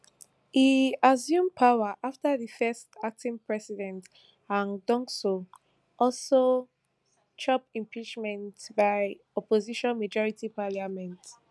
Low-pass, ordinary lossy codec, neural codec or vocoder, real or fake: none; none; none; real